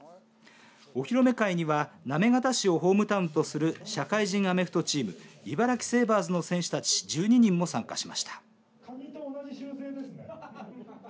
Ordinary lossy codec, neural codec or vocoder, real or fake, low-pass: none; none; real; none